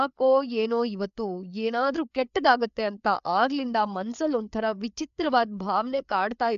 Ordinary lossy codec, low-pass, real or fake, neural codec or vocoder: AAC, 96 kbps; 7.2 kHz; fake; codec, 16 kHz, 4 kbps, FreqCodec, larger model